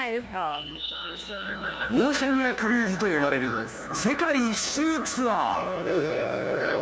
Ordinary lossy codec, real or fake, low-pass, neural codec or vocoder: none; fake; none; codec, 16 kHz, 1 kbps, FunCodec, trained on LibriTTS, 50 frames a second